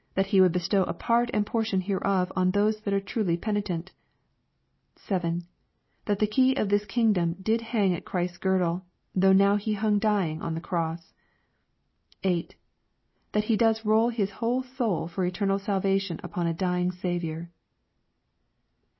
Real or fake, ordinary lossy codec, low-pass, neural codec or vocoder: real; MP3, 24 kbps; 7.2 kHz; none